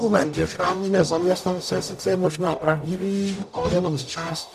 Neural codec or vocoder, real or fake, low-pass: codec, 44.1 kHz, 0.9 kbps, DAC; fake; 14.4 kHz